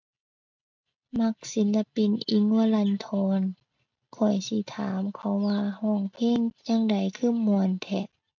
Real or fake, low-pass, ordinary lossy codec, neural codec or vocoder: real; 7.2 kHz; MP3, 64 kbps; none